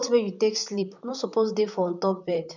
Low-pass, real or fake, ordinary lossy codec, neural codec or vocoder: 7.2 kHz; fake; none; vocoder, 44.1 kHz, 128 mel bands, Pupu-Vocoder